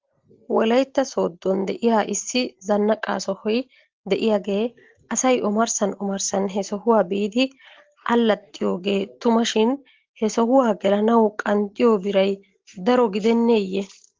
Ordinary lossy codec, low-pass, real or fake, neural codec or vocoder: Opus, 16 kbps; 7.2 kHz; real; none